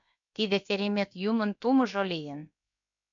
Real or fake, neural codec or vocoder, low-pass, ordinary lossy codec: fake; codec, 16 kHz, about 1 kbps, DyCAST, with the encoder's durations; 7.2 kHz; MP3, 64 kbps